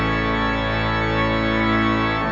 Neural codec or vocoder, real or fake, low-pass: none; real; 7.2 kHz